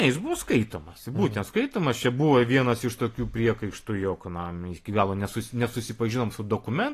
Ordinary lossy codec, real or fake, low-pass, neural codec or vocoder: AAC, 48 kbps; real; 14.4 kHz; none